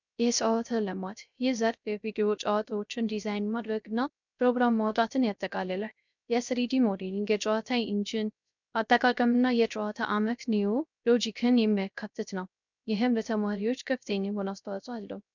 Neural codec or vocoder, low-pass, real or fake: codec, 16 kHz, 0.3 kbps, FocalCodec; 7.2 kHz; fake